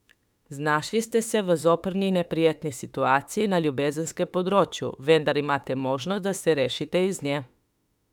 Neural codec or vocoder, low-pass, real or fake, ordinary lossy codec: autoencoder, 48 kHz, 32 numbers a frame, DAC-VAE, trained on Japanese speech; 19.8 kHz; fake; none